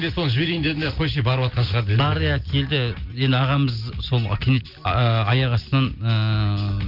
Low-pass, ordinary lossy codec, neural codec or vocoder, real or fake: 5.4 kHz; Opus, 32 kbps; none; real